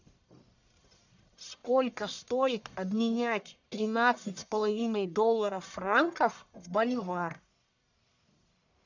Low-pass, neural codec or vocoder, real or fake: 7.2 kHz; codec, 44.1 kHz, 1.7 kbps, Pupu-Codec; fake